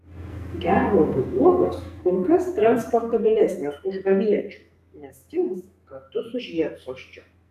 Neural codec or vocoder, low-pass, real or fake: codec, 44.1 kHz, 2.6 kbps, SNAC; 14.4 kHz; fake